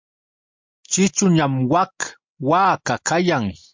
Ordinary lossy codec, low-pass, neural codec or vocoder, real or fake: MP3, 64 kbps; 7.2 kHz; none; real